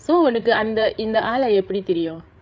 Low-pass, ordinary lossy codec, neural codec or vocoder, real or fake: none; none; codec, 16 kHz, 8 kbps, FreqCodec, larger model; fake